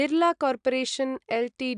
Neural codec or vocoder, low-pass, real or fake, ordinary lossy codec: none; 9.9 kHz; real; AAC, 96 kbps